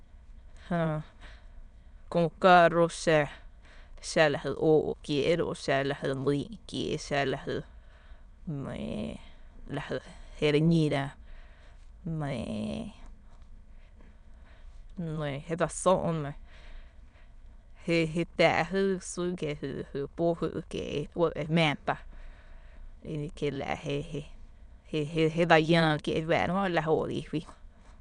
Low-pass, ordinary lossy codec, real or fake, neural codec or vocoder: 9.9 kHz; none; fake; autoencoder, 22.05 kHz, a latent of 192 numbers a frame, VITS, trained on many speakers